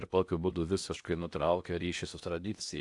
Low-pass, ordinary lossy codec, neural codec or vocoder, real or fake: 10.8 kHz; AAC, 64 kbps; codec, 16 kHz in and 24 kHz out, 0.8 kbps, FocalCodec, streaming, 65536 codes; fake